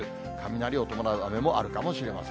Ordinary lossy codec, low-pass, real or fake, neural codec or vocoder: none; none; real; none